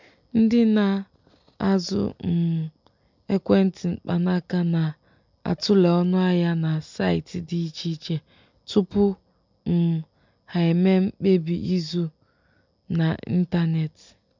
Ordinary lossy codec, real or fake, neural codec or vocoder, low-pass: MP3, 64 kbps; real; none; 7.2 kHz